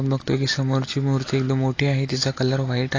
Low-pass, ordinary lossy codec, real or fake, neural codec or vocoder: 7.2 kHz; AAC, 32 kbps; real; none